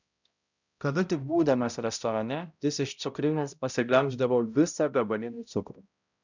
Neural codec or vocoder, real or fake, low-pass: codec, 16 kHz, 0.5 kbps, X-Codec, HuBERT features, trained on balanced general audio; fake; 7.2 kHz